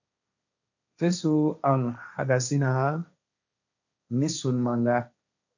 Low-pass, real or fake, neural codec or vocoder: 7.2 kHz; fake; codec, 16 kHz, 1.1 kbps, Voila-Tokenizer